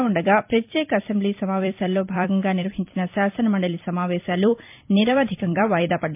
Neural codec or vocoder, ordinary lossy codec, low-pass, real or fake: vocoder, 44.1 kHz, 128 mel bands every 256 samples, BigVGAN v2; MP3, 32 kbps; 3.6 kHz; fake